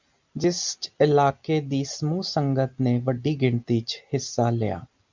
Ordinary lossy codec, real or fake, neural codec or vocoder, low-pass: MP3, 64 kbps; real; none; 7.2 kHz